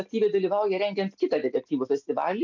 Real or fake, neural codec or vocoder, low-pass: real; none; 7.2 kHz